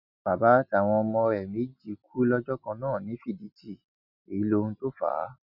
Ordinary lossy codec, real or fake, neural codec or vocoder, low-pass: none; real; none; 5.4 kHz